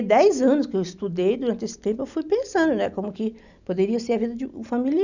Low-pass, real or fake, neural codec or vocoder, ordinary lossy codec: 7.2 kHz; real; none; none